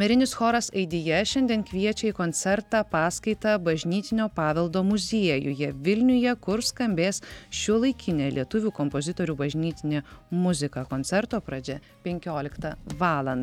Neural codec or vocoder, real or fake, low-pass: none; real; 19.8 kHz